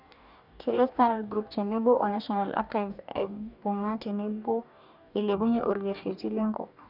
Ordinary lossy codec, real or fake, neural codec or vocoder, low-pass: none; fake; codec, 44.1 kHz, 2.6 kbps, DAC; 5.4 kHz